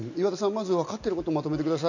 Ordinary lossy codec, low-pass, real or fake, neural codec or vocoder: none; 7.2 kHz; real; none